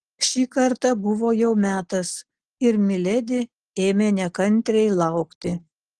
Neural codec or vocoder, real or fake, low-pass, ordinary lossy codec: none; real; 10.8 kHz; Opus, 16 kbps